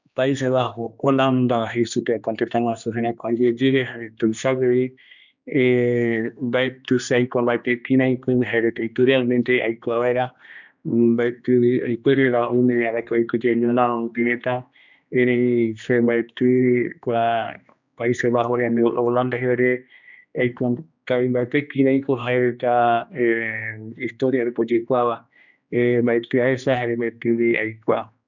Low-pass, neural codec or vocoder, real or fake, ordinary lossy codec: 7.2 kHz; codec, 16 kHz, 2 kbps, X-Codec, HuBERT features, trained on general audio; fake; none